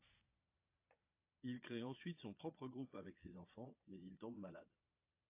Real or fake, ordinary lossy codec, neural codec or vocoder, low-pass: fake; AAC, 32 kbps; vocoder, 22.05 kHz, 80 mel bands, Vocos; 3.6 kHz